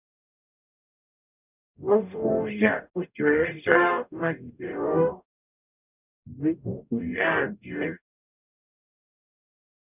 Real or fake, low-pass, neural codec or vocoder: fake; 3.6 kHz; codec, 44.1 kHz, 0.9 kbps, DAC